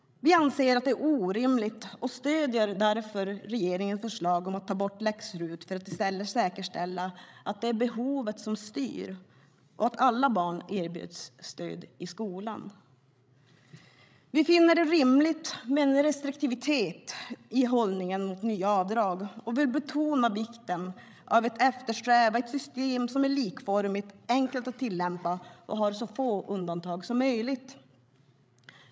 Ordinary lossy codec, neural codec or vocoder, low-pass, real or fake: none; codec, 16 kHz, 16 kbps, FreqCodec, larger model; none; fake